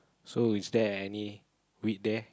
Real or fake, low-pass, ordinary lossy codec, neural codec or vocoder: real; none; none; none